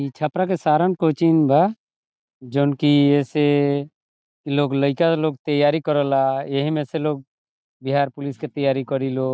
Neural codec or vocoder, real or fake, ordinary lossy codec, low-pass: none; real; none; none